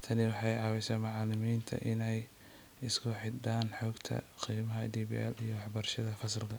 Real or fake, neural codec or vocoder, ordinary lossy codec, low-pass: real; none; none; none